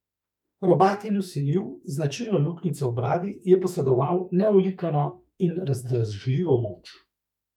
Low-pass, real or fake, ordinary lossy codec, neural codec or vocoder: 19.8 kHz; fake; none; autoencoder, 48 kHz, 32 numbers a frame, DAC-VAE, trained on Japanese speech